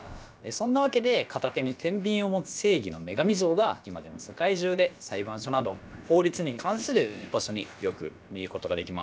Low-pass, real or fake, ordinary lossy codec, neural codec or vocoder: none; fake; none; codec, 16 kHz, about 1 kbps, DyCAST, with the encoder's durations